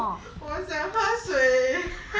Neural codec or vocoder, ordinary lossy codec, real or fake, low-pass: none; none; real; none